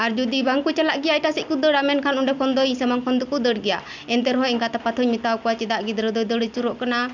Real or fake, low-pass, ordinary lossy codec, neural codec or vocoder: real; 7.2 kHz; none; none